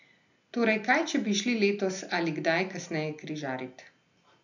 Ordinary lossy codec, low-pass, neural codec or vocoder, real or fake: none; 7.2 kHz; none; real